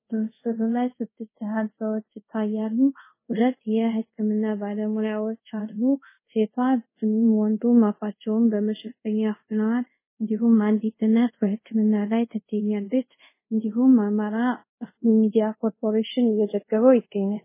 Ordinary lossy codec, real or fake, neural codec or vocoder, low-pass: MP3, 16 kbps; fake; codec, 24 kHz, 0.5 kbps, DualCodec; 3.6 kHz